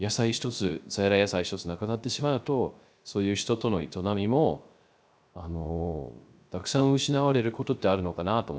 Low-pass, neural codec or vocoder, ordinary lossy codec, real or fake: none; codec, 16 kHz, 0.3 kbps, FocalCodec; none; fake